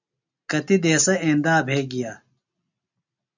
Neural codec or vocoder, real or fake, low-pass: none; real; 7.2 kHz